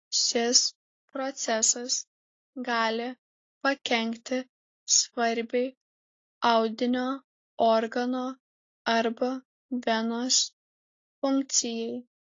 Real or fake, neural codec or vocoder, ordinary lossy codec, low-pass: real; none; AAC, 32 kbps; 7.2 kHz